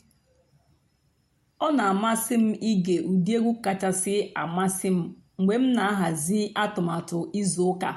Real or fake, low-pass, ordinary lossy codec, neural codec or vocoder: real; 19.8 kHz; MP3, 64 kbps; none